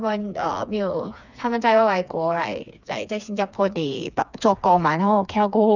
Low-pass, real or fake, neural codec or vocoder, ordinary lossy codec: 7.2 kHz; fake; codec, 16 kHz, 4 kbps, FreqCodec, smaller model; none